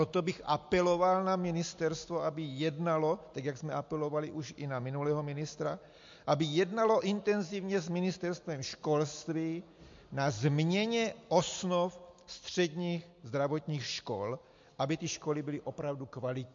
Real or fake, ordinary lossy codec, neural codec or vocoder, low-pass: real; MP3, 48 kbps; none; 7.2 kHz